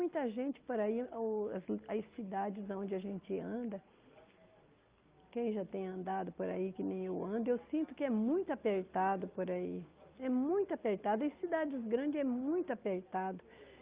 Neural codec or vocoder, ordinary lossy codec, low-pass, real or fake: none; Opus, 32 kbps; 3.6 kHz; real